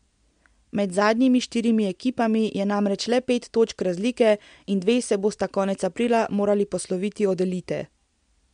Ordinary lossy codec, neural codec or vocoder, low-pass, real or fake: MP3, 64 kbps; none; 9.9 kHz; real